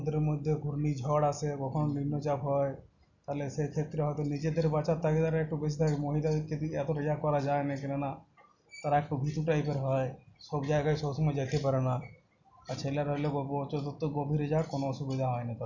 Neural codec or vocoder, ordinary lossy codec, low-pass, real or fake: none; none; 7.2 kHz; real